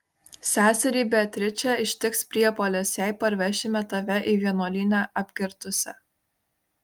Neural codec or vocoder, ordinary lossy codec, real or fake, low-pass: none; Opus, 32 kbps; real; 19.8 kHz